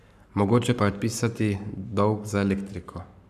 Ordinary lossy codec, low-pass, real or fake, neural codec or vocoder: none; 14.4 kHz; fake; codec, 44.1 kHz, 7.8 kbps, Pupu-Codec